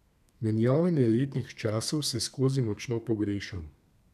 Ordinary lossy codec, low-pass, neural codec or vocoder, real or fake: none; 14.4 kHz; codec, 32 kHz, 1.9 kbps, SNAC; fake